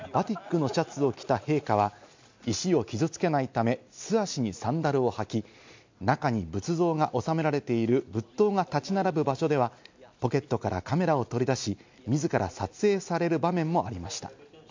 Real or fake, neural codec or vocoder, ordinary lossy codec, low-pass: real; none; MP3, 64 kbps; 7.2 kHz